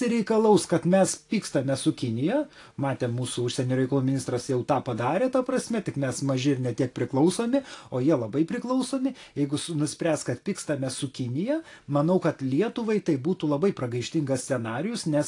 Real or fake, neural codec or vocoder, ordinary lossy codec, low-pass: real; none; AAC, 48 kbps; 10.8 kHz